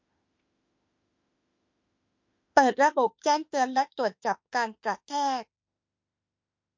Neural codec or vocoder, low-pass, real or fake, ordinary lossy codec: autoencoder, 48 kHz, 32 numbers a frame, DAC-VAE, trained on Japanese speech; 7.2 kHz; fake; MP3, 48 kbps